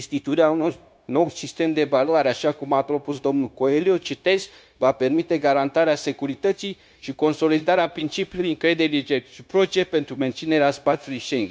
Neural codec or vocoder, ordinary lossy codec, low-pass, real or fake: codec, 16 kHz, 0.9 kbps, LongCat-Audio-Codec; none; none; fake